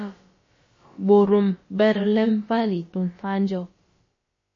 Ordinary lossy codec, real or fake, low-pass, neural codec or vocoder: MP3, 32 kbps; fake; 7.2 kHz; codec, 16 kHz, about 1 kbps, DyCAST, with the encoder's durations